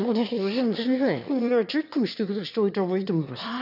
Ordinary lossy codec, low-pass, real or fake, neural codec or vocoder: none; 5.4 kHz; fake; autoencoder, 22.05 kHz, a latent of 192 numbers a frame, VITS, trained on one speaker